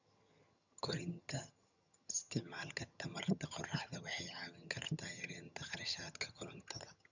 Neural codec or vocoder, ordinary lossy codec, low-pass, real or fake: vocoder, 22.05 kHz, 80 mel bands, HiFi-GAN; none; 7.2 kHz; fake